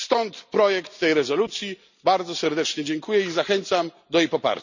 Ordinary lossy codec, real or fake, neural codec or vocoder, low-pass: none; real; none; 7.2 kHz